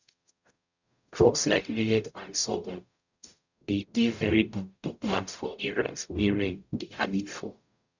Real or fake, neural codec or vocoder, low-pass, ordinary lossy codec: fake; codec, 44.1 kHz, 0.9 kbps, DAC; 7.2 kHz; none